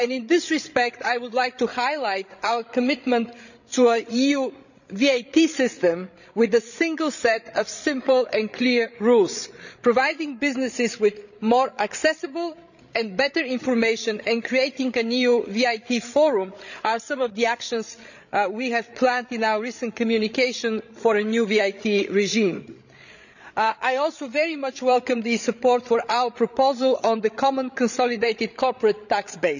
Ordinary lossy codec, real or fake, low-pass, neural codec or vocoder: none; fake; 7.2 kHz; codec, 16 kHz, 16 kbps, FreqCodec, larger model